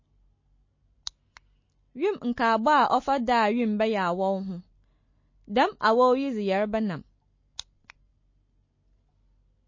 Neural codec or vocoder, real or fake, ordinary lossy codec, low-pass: none; real; MP3, 32 kbps; 7.2 kHz